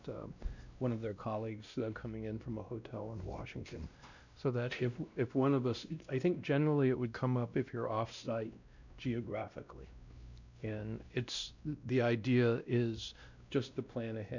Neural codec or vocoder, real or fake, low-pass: codec, 16 kHz, 1 kbps, X-Codec, WavLM features, trained on Multilingual LibriSpeech; fake; 7.2 kHz